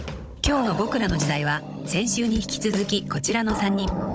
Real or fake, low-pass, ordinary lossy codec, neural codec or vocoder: fake; none; none; codec, 16 kHz, 16 kbps, FunCodec, trained on Chinese and English, 50 frames a second